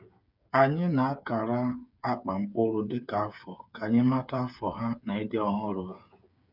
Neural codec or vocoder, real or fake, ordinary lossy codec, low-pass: codec, 16 kHz, 8 kbps, FreqCodec, smaller model; fake; none; 5.4 kHz